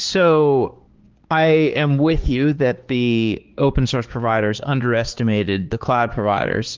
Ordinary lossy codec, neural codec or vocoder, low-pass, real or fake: Opus, 16 kbps; codec, 16 kHz, 4 kbps, X-Codec, HuBERT features, trained on balanced general audio; 7.2 kHz; fake